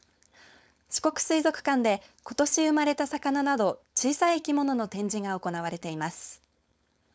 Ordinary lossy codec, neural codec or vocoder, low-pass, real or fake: none; codec, 16 kHz, 4.8 kbps, FACodec; none; fake